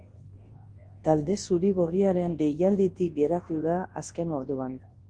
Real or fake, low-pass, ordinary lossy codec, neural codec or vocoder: fake; 9.9 kHz; Opus, 16 kbps; codec, 24 kHz, 0.9 kbps, WavTokenizer, large speech release